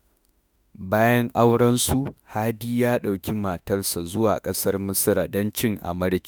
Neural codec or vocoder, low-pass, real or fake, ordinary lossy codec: autoencoder, 48 kHz, 32 numbers a frame, DAC-VAE, trained on Japanese speech; none; fake; none